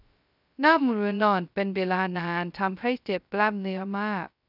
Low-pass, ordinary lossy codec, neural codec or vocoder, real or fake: 5.4 kHz; none; codec, 16 kHz, 0.2 kbps, FocalCodec; fake